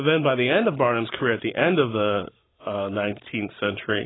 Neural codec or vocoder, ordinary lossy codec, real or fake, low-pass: codec, 44.1 kHz, 7.8 kbps, Pupu-Codec; AAC, 16 kbps; fake; 7.2 kHz